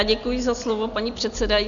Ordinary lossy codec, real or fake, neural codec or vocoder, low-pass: MP3, 96 kbps; real; none; 7.2 kHz